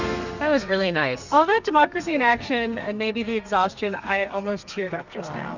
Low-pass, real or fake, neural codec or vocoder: 7.2 kHz; fake; codec, 32 kHz, 1.9 kbps, SNAC